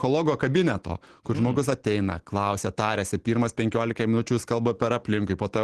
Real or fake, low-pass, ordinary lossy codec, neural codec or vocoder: real; 10.8 kHz; Opus, 16 kbps; none